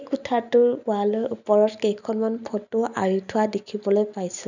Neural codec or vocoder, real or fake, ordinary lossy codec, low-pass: none; real; none; 7.2 kHz